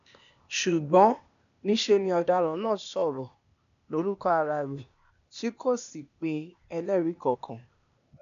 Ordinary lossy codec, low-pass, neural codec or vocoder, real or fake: none; 7.2 kHz; codec, 16 kHz, 0.8 kbps, ZipCodec; fake